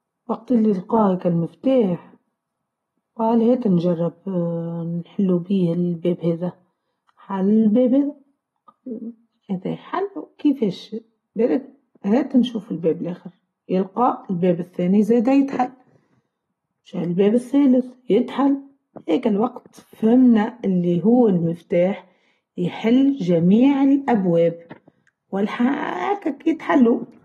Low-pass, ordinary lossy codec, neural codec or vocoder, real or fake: 19.8 kHz; AAC, 32 kbps; none; real